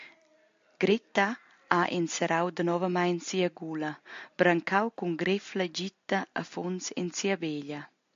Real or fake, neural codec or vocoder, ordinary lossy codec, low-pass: real; none; AAC, 64 kbps; 7.2 kHz